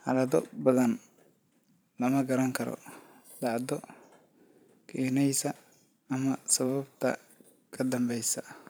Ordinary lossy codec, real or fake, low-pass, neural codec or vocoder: none; fake; none; vocoder, 44.1 kHz, 128 mel bands every 512 samples, BigVGAN v2